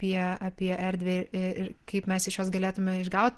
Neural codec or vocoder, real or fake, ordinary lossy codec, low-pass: none; real; Opus, 16 kbps; 10.8 kHz